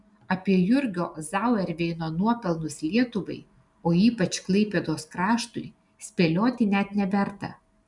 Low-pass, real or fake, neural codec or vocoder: 10.8 kHz; real; none